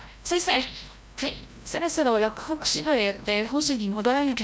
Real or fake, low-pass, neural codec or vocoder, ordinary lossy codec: fake; none; codec, 16 kHz, 0.5 kbps, FreqCodec, larger model; none